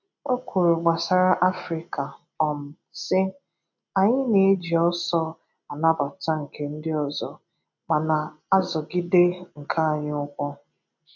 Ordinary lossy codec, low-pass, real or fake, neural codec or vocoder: none; 7.2 kHz; real; none